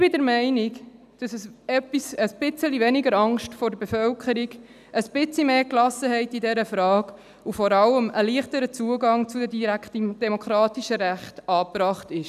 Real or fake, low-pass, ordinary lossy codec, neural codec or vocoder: real; 14.4 kHz; none; none